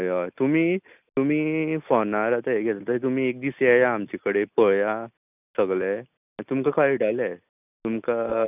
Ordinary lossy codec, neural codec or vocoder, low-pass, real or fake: none; none; 3.6 kHz; real